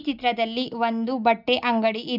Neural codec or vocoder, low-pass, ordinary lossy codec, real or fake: none; 5.4 kHz; none; real